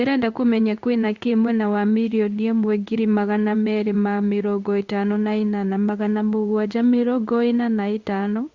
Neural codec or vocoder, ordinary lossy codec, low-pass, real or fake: codec, 16 kHz in and 24 kHz out, 1 kbps, XY-Tokenizer; Opus, 64 kbps; 7.2 kHz; fake